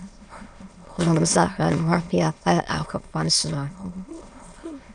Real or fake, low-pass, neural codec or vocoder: fake; 9.9 kHz; autoencoder, 22.05 kHz, a latent of 192 numbers a frame, VITS, trained on many speakers